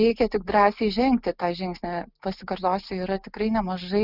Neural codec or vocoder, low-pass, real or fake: none; 5.4 kHz; real